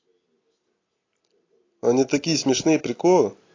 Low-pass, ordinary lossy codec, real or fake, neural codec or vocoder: 7.2 kHz; AAC, 32 kbps; real; none